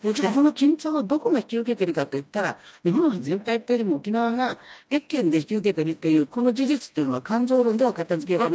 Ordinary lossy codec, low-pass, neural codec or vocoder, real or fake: none; none; codec, 16 kHz, 1 kbps, FreqCodec, smaller model; fake